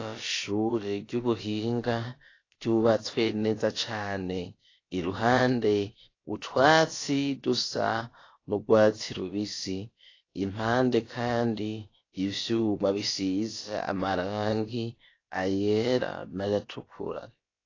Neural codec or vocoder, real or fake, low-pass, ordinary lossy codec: codec, 16 kHz, about 1 kbps, DyCAST, with the encoder's durations; fake; 7.2 kHz; AAC, 32 kbps